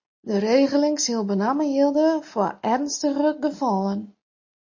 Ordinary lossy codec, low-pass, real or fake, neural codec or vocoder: MP3, 32 kbps; 7.2 kHz; real; none